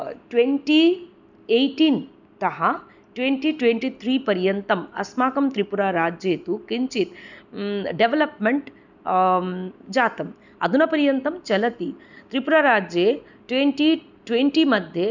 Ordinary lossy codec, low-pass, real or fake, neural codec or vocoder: none; 7.2 kHz; real; none